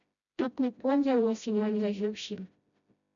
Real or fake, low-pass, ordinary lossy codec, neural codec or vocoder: fake; 7.2 kHz; MP3, 96 kbps; codec, 16 kHz, 0.5 kbps, FreqCodec, smaller model